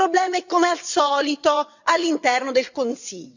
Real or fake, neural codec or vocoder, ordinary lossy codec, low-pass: fake; vocoder, 22.05 kHz, 80 mel bands, WaveNeXt; none; 7.2 kHz